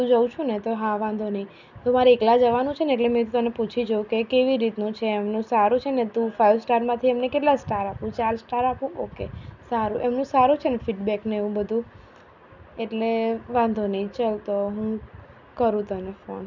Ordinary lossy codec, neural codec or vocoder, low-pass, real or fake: none; none; 7.2 kHz; real